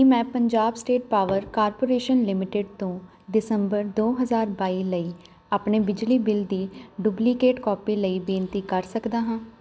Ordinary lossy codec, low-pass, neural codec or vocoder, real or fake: none; none; none; real